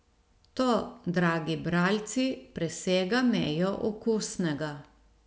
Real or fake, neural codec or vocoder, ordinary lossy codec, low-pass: real; none; none; none